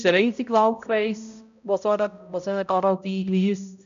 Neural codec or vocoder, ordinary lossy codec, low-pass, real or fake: codec, 16 kHz, 0.5 kbps, X-Codec, HuBERT features, trained on balanced general audio; none; 7.2 kHz; fake